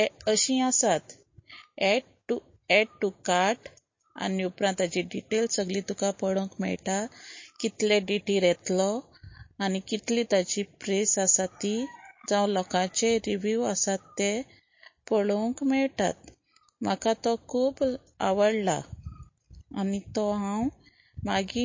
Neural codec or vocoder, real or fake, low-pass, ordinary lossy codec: none; real; 7.2 kHz; MP3, 32 kbps